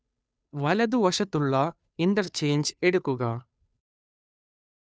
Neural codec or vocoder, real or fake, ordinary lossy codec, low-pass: codec, 16 kHz, 2 kbps, FunCodec, trained on Chinese and English, 25 frames a second; fake; none; none